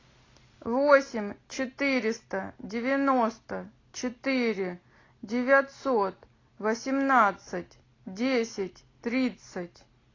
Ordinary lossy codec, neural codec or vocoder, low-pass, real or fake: AAC, 32 kbps; none; 7.2 kHz; real